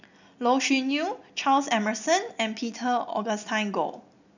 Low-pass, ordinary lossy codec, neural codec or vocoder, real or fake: 7.2 kHz; none; none; real